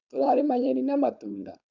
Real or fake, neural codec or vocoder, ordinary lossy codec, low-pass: fake; codec, 16 kHz, 4.8 kbps, FACodec; none; 7.2 kHz